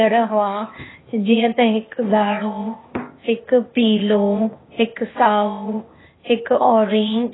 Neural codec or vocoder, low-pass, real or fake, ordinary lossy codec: codec, 16 kHz, 0.8 kbps, ZipCodec; 7.2 kHz; fake; AAC, 16 kbps